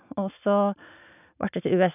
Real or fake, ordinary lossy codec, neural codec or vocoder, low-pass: real; none; none; 3.6 kHz